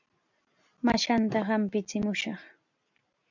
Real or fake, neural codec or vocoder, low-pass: real; none; 7.2 kHz